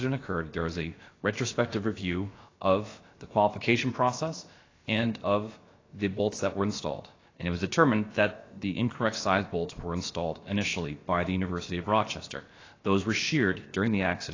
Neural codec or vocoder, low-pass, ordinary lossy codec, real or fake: codec, 16 kHz, about 1 kbps, DyCAST, with the encoder's durations; 7.2 kHz; AAC, 32 kbps; fake